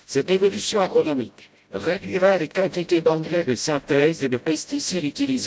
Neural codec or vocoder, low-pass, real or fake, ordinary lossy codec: codec, 16 kHz, 0.5 kbps, FreqCodec, smaller model; none; fake; none